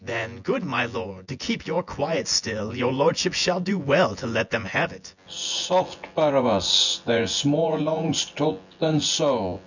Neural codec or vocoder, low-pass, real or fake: vocoder, 24 kHz, 100 mel bands, Vocos; 7.2 kHz; fake